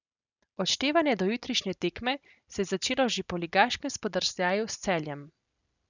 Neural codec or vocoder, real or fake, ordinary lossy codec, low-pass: none; real; none; 7.2 kHz